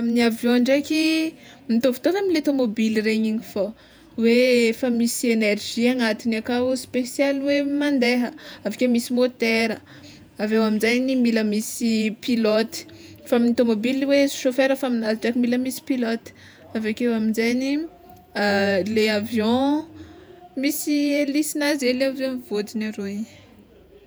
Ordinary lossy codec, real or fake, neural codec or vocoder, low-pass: none; fake; vocoder, 48 kHz, 128 mel bands, Vocos; none